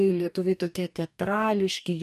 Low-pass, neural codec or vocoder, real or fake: 14.4 kHz; codec, 44.1 kHz, 2.6 kbps, DAC; fake